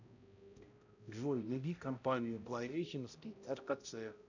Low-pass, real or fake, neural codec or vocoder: 7.2 kHz; fake; codec, 16 kHz, 0.5 kbps, X-Codec, HuBERT features, trained on balanced general audio